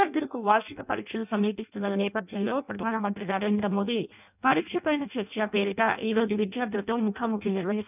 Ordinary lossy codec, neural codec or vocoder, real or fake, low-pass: none; codec, 16 kHz in and 24 kHz out, 0.6 kbps, FireRedTTS-2 codec; fake; 3.6 kHz